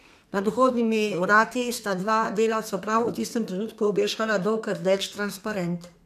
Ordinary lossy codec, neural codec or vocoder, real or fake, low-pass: none; codec, 32 kHz, 1.9 kbps, SNAC; fake; 14.4 kHz